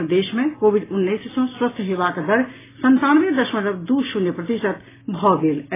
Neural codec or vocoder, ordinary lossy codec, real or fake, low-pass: none; AAC, 16 kbps; real; 3.6 kHz